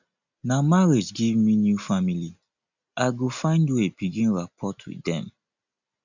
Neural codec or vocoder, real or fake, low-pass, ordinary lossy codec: none; real; 7.2 kHz; none